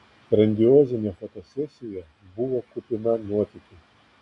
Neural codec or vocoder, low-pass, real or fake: none; 10.8 kHz; real